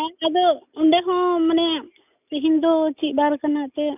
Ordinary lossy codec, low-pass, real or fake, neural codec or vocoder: none; 3.6 kHz; real; none